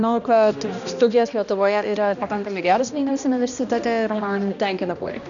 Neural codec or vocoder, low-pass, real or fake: codec, 16 kHz, 1 kbps, X-Codec, HuBERT features, trained on balanced general audio; 7.2 kHz; fake